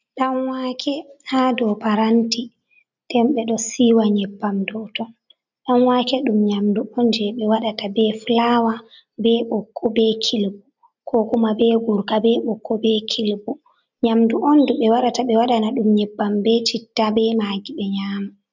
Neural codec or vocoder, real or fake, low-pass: none; real; 7.2 kHz